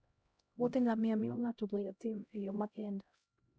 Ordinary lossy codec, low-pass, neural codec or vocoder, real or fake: none; none; codec, 16 kHz, 0.5 kbps, X-Codec, HuBERT features, trained on LibriSpeech; fake